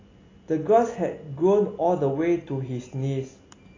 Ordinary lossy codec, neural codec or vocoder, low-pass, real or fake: AAC, 32 kbps; none; 7.2 kHz; real